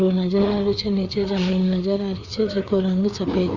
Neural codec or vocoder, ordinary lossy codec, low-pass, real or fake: codec, 16 kHz, 4 kbps, FreqCodec, larger model; Opus, 64 kbps; 7.2 kHz; fake